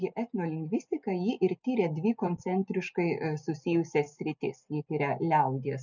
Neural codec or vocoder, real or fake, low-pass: none; real; 7.2 kHz